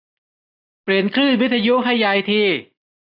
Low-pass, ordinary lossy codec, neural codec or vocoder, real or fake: 5.4 kHz; none; none; real